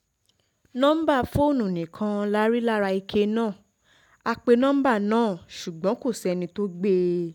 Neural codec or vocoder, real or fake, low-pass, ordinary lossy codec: none; real; 19.8 kHz; none